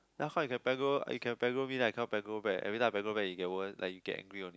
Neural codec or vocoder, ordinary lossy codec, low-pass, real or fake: none; none; none; real